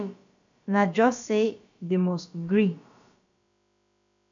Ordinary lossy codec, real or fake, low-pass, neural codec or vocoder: MP3, 64 kbps; fake; 7.2 kHz; codec, 16 kHz, about 1 kbps, DyCAST, with the encoder's durations